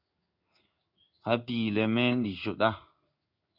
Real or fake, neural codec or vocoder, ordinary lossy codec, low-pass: fake; codec, 16 kHz in and 24 kHz out, 1 kbps, XY-Tokenizer; Opus, 64 kbps; 5.4 kHz